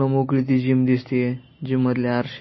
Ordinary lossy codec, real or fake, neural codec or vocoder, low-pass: MP3, 24 kbps; real; none; 7.2 kHz